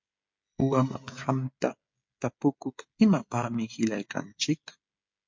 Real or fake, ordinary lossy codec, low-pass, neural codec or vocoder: fake; MP3, 48 kbps; 7.2 kHz; codec, 16 kHz, 16 kbps, FreqCodec, smaller model